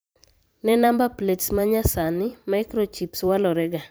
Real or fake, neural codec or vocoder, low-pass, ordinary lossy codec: fake; vocoder, 44.1 kHz, 128 mel bands every 512 samples, BigVGAN v2; none; none